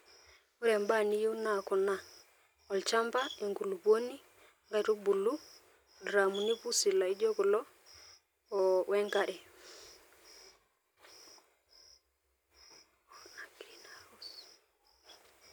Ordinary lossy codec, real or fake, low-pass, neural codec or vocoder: none; real; none; none